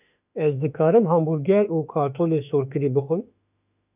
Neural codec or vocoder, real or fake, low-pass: autoencoder, 48 kHz, 32 numbers a frame, DAC-VAE, trained on Japanese speech; fake; 3.6 kHz